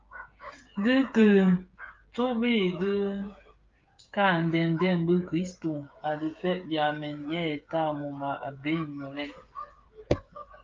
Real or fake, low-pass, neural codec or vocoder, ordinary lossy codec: fake; 7.2 kHz; codec, 16 kHz, 8 kbps, FreqCodec, smaller model; Opus, 24 kbps